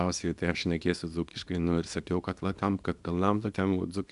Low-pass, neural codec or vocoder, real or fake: 10.8 kHz; codec, 24 kHz, 0.9 kbps, WavTokenizer, small release; fake